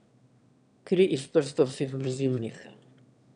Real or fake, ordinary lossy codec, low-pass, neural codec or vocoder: fake; none; 9.9 kHz; autoencoder, 22.05 kHz, a latent of 192 numbers a frame, VITS, trained on one speaker